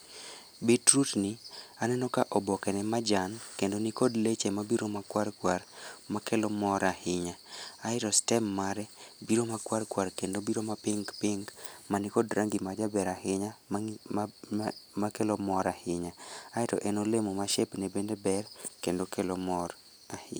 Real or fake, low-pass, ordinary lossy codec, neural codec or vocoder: real; none; none; none